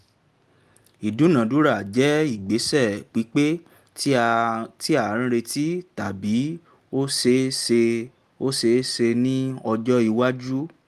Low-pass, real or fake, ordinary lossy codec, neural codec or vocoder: 14.4 kHz; real; Opus, 32 kbps; none